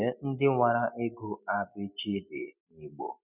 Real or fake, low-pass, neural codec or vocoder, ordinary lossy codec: real; 3.6 kHz; none; none